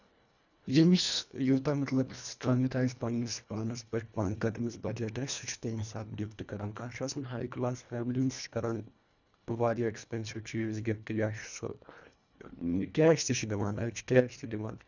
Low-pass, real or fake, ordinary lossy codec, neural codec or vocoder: 7.2 kHz; fake; none; codec, 24 kHz, 1.5 kbps, HILCodec